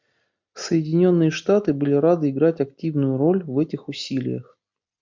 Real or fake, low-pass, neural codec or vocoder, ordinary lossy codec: real; 7.2 kHz; none; MP3, 64 kbps